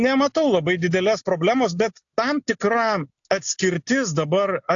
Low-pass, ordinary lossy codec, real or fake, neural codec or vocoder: 7.2 kHz; AAC, 64 kbps; real; none